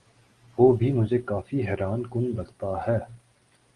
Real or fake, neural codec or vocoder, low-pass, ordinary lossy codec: real; none; 10.8 kHz; Opus, 24 kbps